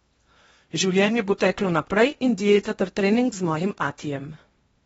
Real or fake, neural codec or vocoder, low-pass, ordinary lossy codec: fake; codec, 16 kHz in and 24 kHz out, 0.8 kbps, FocalCodec, streaming, 65536 codes; 10.8 kHz; AAC, 24 kbps